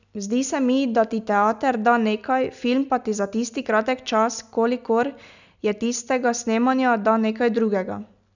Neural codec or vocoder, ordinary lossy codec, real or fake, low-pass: none; none; real; 7.2 kHz